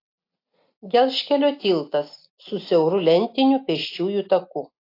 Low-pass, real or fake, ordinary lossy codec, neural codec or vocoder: 5.4 kHz; real; AAC, 32 kbps; none